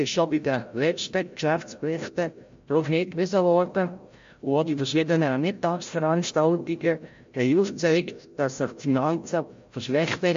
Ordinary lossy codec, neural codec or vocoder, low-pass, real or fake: MP3, 48 kbps; codec, 16 kHz, 0.5 kbps, FreqCodec, larger model; 7.2 kHz; fake